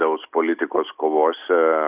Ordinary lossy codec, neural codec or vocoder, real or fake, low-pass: Opus, 64 kbps; none; real; 3.6 kHz